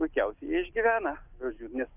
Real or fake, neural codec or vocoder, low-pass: real; none; 3.6 kHz